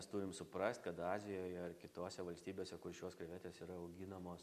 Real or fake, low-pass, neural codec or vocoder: real; 14.4 kHz; none